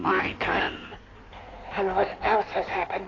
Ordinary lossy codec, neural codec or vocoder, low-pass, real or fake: AAC, 32 kbps; codec, 16 kHz in and 24 kHz out, 1.1 kbps, FireRedTTS-2 codec; 7.2 kHz; fake